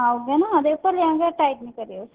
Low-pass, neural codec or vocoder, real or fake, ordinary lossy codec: 3.6 kHz; none; real; Opus, 16 kbps